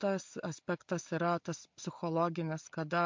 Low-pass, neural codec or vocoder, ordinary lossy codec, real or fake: 7.2 kHz; none; MP3, 48 kbps; real